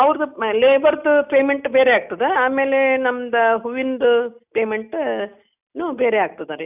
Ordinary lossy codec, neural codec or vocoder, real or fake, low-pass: none; none; real; 3.6 kHz